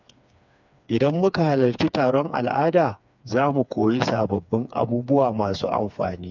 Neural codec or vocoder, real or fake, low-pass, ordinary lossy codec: codec, 16 kHz, 4 kbps, FreqCodec, smaller model; fake; 7.2 kHz; none